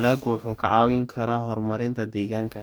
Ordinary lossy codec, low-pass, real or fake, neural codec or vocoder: none; none; fake; codec, 44.1 kHz, 2.6 kbps, DAC